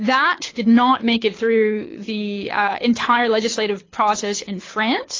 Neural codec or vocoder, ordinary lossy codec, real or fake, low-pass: codec, 24 kHz, 6 kbps, HILCodec; AAC, 32 kbps; fake; 7.2 kHz